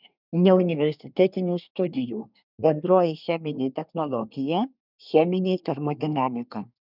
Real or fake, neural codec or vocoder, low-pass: fake; codec, 24 kHz, 1 kbps, SNAC; 5.4 kHz